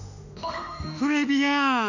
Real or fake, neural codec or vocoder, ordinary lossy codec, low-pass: fake; autoencoder, 48 kHz, 32 numbers a frame, DAC-VAE, trained on Japanese speech; none; 7.2 kHz